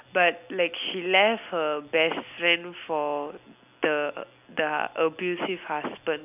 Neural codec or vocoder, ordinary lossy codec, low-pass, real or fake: none; none; 3.6 kHz; real